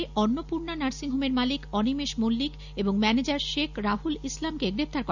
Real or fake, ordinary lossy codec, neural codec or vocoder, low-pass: real; none; none; 7.2 kHz